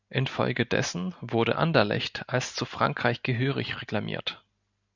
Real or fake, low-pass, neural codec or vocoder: real; 7.2 kHz; none